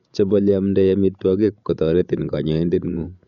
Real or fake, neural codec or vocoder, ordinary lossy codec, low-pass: fake; codec, 16 kHz, 16 kbps, FreqCodec, larger model; none; 7.2 kHz